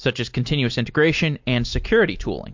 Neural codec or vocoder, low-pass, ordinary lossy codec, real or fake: none; 7.2 kHz; MP3, 48 kbps; real